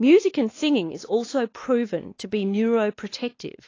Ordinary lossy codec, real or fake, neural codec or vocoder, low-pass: AAC, 32 kbps; fake; codec, 16 kHz, 2 kbps, X-Codec, WavLM features, trained on Multilingual LibriSpeech; 7.2 kHz